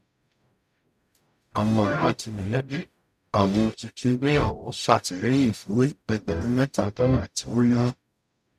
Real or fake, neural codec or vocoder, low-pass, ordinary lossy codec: fake; codec, 44.1 kHz, 0.9 kbps, DAC; 14.4 kHz; none